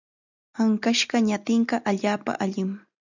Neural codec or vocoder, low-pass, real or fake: none; 7.2 kHz; real